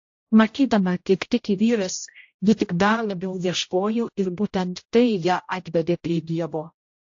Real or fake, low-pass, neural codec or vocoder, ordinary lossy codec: fake; 7.2 kHz; codec, 16 kHz, 0.5 kbps, X-Codec, HuBERT features, trained on general audio; AAC, 48 kbps